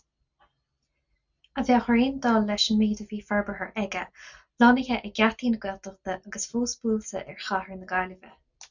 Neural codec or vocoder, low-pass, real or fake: none; 7.2 kHz; real